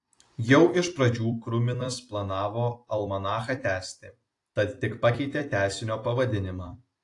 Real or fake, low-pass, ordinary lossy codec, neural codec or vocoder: fake; 10.8 kHz; AAC, 48 kbps; vocoder, 44.1 kHz, 128 mel bands every 256 samples, BigVGAN v2